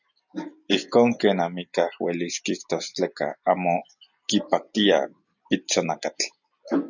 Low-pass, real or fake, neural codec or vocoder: 7.2 kHz; real; none